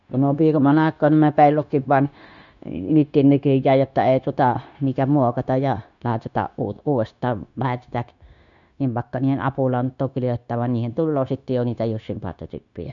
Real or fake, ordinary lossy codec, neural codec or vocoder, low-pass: fake; none; codec, 16 kHz, 0.9 kbps, LongCat-Audio-Codec; 7.2 kHz